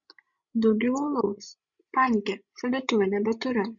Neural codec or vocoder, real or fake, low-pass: codec, 16 kHz, 16 kbps, FreqCodec, larger model; fake; 7.2 kHz